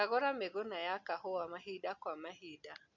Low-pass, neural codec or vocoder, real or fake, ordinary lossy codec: 7.2 kHz; none; real; none